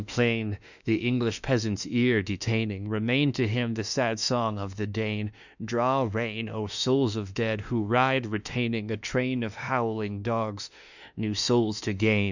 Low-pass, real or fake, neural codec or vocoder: 7.2 kHz; fake; autoencoder, 48 kHz, 32 numbers a frame, DAC-VAE, trained on Japanese speech